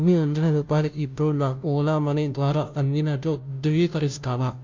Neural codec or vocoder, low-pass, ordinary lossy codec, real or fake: codec, 16 kHz, 0.5 kbps, FunCodec, trained on Chinese and English, 25 frames a second; 7.2 kHz; MP3, 64 kbps; fake